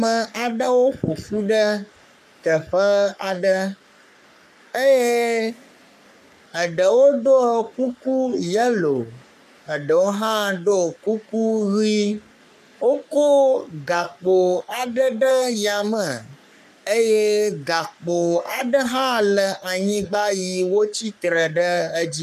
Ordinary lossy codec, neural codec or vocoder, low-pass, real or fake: MP3, 96 kbps; codec, 44.1 kHz, 3.4 kbps, Pupu-Codec; 14.4 kHz; fake